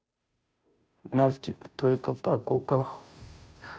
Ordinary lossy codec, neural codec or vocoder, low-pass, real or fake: none; codec, 16 kHz, 0.5 kbps, FunCodec, trained on Chinese and English, 25 frames a second; none; fake